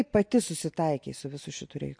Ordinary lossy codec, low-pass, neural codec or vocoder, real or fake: MP3, 48 kbps; 9.9 kHz; none; real